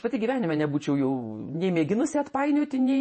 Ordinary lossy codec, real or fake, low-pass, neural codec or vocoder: MP3, 32 kbps; fake; 10.8 kHz; vocoder, 48 kHz, 128 mel bands, Vocos